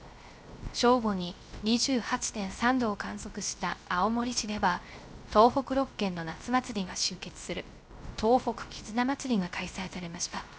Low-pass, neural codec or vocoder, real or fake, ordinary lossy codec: none; codec, 16 kHz, 0.3 kbps, FocalCodec; fake; none